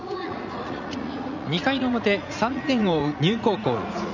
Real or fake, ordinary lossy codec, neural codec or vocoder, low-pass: fake; none; vocoder, 44.1 kHz, 80 mel bands, Vocos; 7.2 kHz